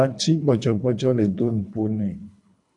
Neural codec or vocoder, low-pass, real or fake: codec, 24 kHz, 3 kbps, HILCodec; 10.8 kHz; fake